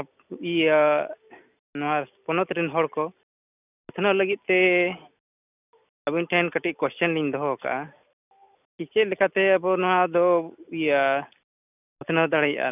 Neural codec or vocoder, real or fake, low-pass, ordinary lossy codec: none; real; 3.6 kHz; none